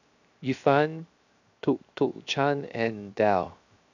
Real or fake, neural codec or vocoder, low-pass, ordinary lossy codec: fake; codec, 16 kHz, 0.7 kbps, FocalCodec; 7.2 kHz; none